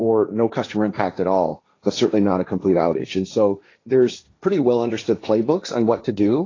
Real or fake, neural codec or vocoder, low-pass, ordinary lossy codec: fake; codec, 16 kHz, 1.1 kbps, Voila-Tokenizer; 7.2 kHz; AAC, 32 kbps